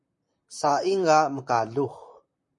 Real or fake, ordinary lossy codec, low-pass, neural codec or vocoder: fake; MP3, 48 kbps; 10.8 kHz; vocoder, 44.1 kHz, 128 mel bands, Pupu-Vocoder